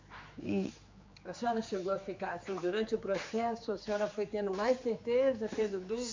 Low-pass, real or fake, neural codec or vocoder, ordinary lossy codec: 7.2 kHz; fake; codec, 16 kHz, 4 kbps, X-Codec, HuBERT features, trained on general audio; MP3, 64 kbps